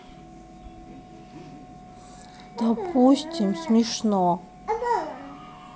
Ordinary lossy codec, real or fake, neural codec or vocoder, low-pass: none; real; none; none